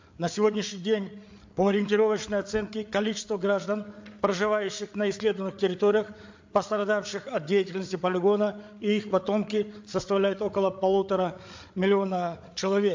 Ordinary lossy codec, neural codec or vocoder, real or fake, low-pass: MP3, 48 kbps; codec, 16 kHz, 8 kbps, FreqCodec, larger model; fake; 7.2 kHz